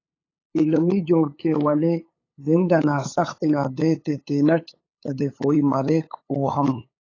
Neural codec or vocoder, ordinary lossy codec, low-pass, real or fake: codec, 16 kHz, 8 kbps, FunCodec, trained on LibriTTS, 25 frames a second; AAC, 32 kbps; 7.2 kHz; fake